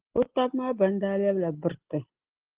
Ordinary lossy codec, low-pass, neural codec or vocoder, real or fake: Opus, 16 kbps; 3.6 kHz; none; real